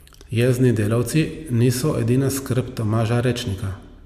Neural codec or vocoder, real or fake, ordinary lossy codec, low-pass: none; real; MP3, 96 kbps; 14.4 kHz